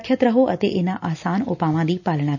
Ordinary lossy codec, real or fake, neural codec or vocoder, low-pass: none; real; none; 7.2 kHz